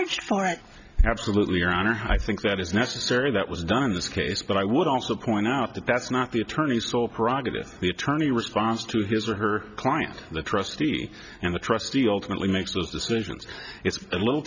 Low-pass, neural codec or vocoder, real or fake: 7.2 kHz; none; real